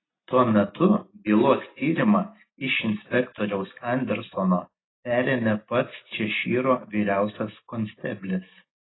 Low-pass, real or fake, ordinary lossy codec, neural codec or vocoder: 7.2 kHz; real; AAC, 16 kbps; none